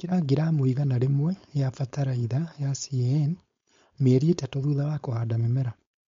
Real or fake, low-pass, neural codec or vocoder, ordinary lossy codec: fake; 7.2 kHz; codec, 16 kHz, 4.8 kbps, FACodec; MP3, 48 kbps